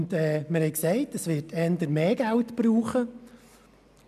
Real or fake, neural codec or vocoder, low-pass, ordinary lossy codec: real; none; 14.4 kHz; none